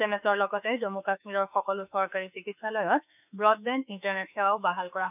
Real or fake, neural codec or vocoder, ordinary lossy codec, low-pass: fake; autoencoder, 48 kHz, 32 numbers a frame, DAC-VAE, trained on Japanese speech; none; 3.6 kHz